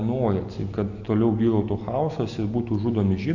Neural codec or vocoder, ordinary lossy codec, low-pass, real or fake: none; AAC, 48 kbps; 7.2 kHz; real